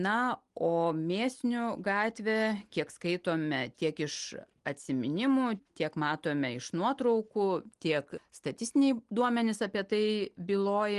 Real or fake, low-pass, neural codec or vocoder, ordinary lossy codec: real; 10.8 kHz; none; Opus, 24 kbps